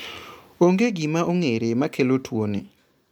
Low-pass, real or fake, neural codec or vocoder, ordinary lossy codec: 19.8 kHz; real; none; MP3, 96 kbps